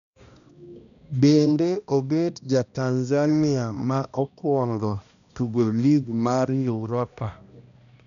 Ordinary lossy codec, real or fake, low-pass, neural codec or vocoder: none; fake; 7.2 kHz; codec, 16 kHz, 1 kbps, X-Codec, HuBERT features, trained on balanced general audio